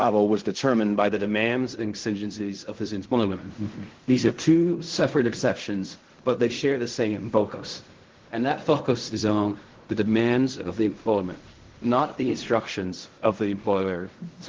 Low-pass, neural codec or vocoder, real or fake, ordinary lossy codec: 7.2 kHz; codec, 16 kHz in and 24 kHz out, 0.4 kbps, LongCat-Audio-Codec, fine tuned four codebook decoder; fake; Opus, 16 kbps